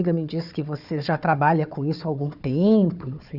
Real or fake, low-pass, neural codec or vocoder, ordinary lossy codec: fake; 5.4 kHz; codec, 16 kHz, 4 kbps, FunCodec, trained on Chinese and English, 50 frames a second; none